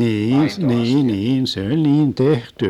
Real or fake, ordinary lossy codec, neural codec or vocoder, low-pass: real; none; none; 19.8 kHz